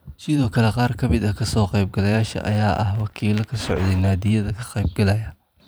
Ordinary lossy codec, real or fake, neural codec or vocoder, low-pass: none; fake; vocoder, 44.1 kHz, 128 mel bands every 512 samples, BigVGAN v2; none